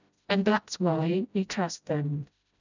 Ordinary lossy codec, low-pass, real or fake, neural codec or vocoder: none; 7.2 kHz; fake; codec, 16 kHz, 0.5 kbps, FreqCodec, smaller model